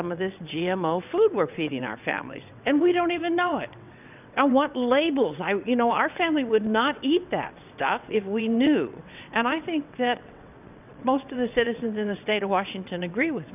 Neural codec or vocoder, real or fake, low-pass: vocoder, 22.05 kHz, 80 mel bands, WaveNeXt; fake; 3.6 kHz